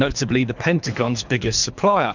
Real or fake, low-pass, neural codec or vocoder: fake; 7.2 kHz; codec, 24 kHz, 3 kbps, HILCodec